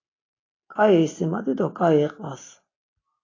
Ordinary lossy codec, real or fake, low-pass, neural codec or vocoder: AAC, 32 kbps; real; 7.2 kHz; none